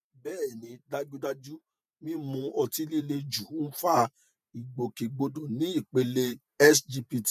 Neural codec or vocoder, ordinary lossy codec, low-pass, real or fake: vocoder, 48 kHz, 128 mel bands, Vocos; none; 14.4 kHz; fake